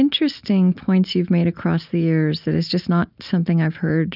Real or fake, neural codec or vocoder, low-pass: real; none; 5.4 kHz